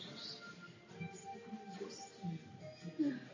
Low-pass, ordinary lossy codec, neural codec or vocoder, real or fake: 7.2 kHz; AAC, 32 kbps; none; real